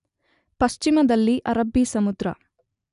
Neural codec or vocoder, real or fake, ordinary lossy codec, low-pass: none; real; none; 10.8 kHz